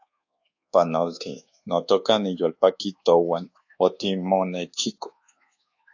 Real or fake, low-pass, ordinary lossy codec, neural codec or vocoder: fake; 7.2 kHz; AAC, 48 kbps; codec, 24 kHz, 1.2 kbps, DualCodec